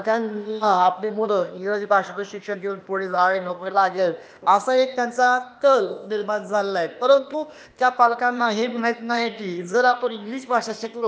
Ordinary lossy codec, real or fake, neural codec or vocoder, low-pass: none; fake; codec, 16 kHz, 0.8 kbps, ZipCodec; none